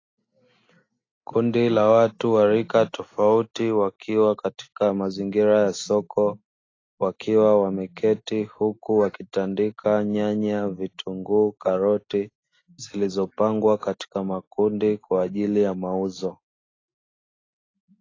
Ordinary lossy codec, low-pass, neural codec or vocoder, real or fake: AAC, 32 kbps; 7.2 kHz; none; real